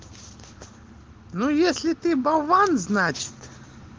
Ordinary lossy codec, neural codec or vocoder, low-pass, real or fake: Opus, 16 kbps; none; 7.2 kHz; real